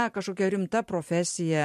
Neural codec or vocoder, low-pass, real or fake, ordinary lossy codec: vocoder, 44.1 kHz, 128 mel bands every 512 samples, BigVGAN v2; 14.4 kHz; fake; MP3, 64 kbps